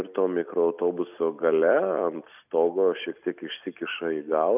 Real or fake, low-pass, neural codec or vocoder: real; 3.6 kHz; none